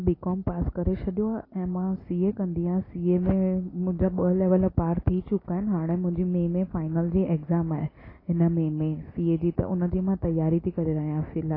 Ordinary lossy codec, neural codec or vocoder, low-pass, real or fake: AAC, 24 kbps; none; 5.4 kHz; real